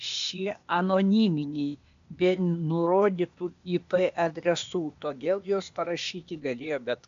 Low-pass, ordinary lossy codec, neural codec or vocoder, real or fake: 7.2 kHz; AAC, 64 kbps; codec, 16 kHz, 0.8 kbps, ZipCodec; fake